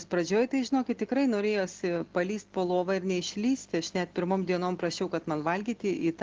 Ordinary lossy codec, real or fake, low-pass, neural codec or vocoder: Opus, 16 kbps; real; 7.2 kHz; none